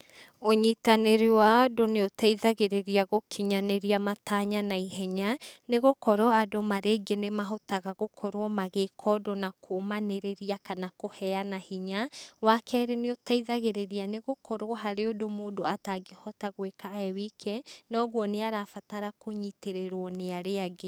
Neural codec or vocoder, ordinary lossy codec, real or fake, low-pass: codec, 44.1 kHz, 7.8 kbps, DAC; none; fake; none